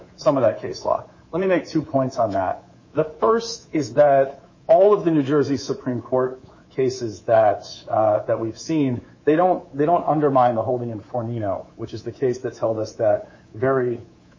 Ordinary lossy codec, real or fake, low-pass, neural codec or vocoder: MP3, 32 kbps; fake; 7.2 kHz; codec, 24 kHz, 3.1 kbps, DualCodec